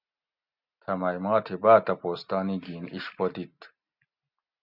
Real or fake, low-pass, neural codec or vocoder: real; 5.4 kHz; none